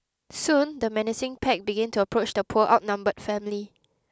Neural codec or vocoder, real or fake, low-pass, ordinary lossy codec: none; real; none; none